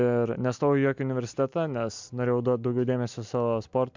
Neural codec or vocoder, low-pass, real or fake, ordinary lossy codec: none; 7.2 kHz; real; MP3, 64 kbps